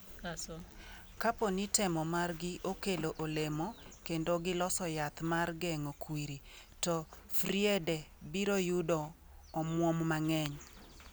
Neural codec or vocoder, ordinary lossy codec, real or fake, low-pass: none; none; real; none